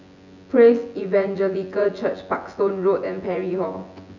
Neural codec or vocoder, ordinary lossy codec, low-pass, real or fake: vocoder, 24 kHz, 100 mel bands, Vocos; none; 7.2 kHz; fake